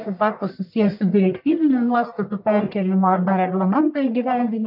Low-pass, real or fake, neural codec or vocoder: 5.4 kHz; fake; codec, 44.1 kHz, 1.7 kbps, Pupu-Codec